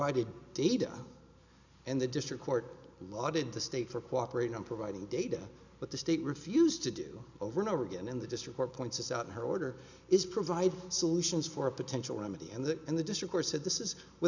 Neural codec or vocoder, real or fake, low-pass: none; real; 7.2 kHz